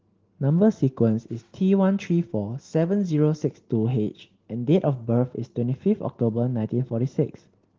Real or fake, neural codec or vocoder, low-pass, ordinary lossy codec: real; none; 7.2 kHz; Opus, 16 kbps